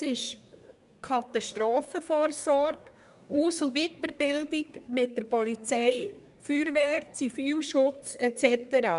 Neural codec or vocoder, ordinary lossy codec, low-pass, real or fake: codec, 24 kHz, 1 kbps, SNAC; none; 10.8 kHz; fake